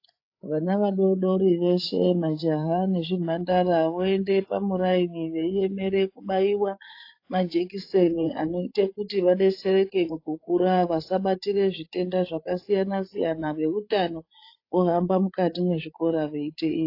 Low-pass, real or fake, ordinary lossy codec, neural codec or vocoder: 5.4 kHz; fake; AAC, 32 kbps; codec, 16 kHz, 8 kbps, FreqCodec, larger model